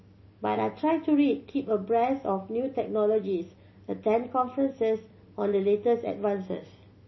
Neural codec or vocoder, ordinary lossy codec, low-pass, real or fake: none; MP3, 24 kbps; 7.2 kHz; real